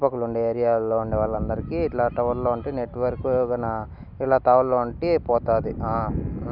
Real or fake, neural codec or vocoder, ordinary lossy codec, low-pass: real; none; none; 5.4 kHz